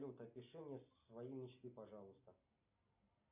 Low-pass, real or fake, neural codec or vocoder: 3.6 kHz; real; none